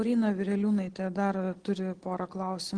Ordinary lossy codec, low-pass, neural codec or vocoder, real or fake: Opus, 16 kbps; 9.9 kHz; none; real